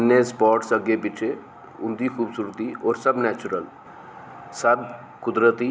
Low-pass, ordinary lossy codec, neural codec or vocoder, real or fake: none; none; none; real